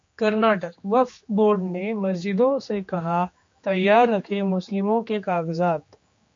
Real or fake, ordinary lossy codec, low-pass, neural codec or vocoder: fake; MP3, 48 kbps; 7.2 kHz; codec, 16 kHz, 2 kbps, X-Codec, HuBERT features, trained on general audio